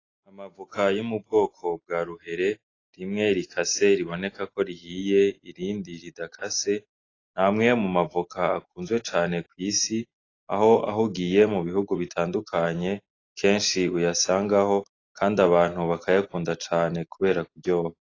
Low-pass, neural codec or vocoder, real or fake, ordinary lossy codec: 7.2 kHz; none; real; AAC, 32 kbps